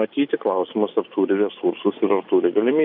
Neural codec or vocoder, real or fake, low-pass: none; real; 5.4 kHz